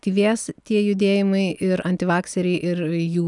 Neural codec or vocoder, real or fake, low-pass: none; real; 10.8 kHz